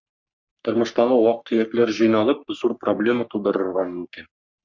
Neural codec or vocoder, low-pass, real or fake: codec, 44.1 kHz, 3.4 kbps, Pupu-Codec; 7.2 kHz; fake